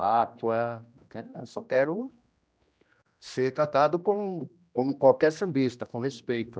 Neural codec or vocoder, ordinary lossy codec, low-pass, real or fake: codec, 16 kHz, 1 kbps, X-Codec, HuBERT features, trained on general audio; none; none; fake